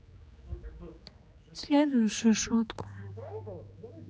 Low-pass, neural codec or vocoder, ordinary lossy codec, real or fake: none; codec, 16 kHz, 2 kbps, X-Codec, HuBERT features, trained on general audio; none; fake